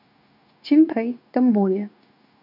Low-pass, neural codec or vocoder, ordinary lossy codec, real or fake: 5.4 kHz; codec, 16 kHz, 0.9 kbps, LongCat-Audio-Codec; none; fake